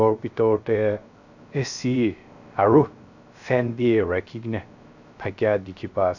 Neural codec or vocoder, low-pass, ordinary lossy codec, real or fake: codec, 16 kHz, 0.3 kbps, FocalCodec; 7.2 kHz; none; fake